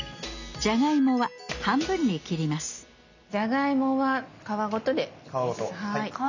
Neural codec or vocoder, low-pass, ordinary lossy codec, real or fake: none; 7.2 kHz; none; real